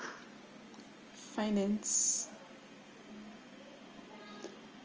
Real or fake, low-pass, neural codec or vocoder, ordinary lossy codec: real; 7.2 kHz; none; Opus, 24 kbps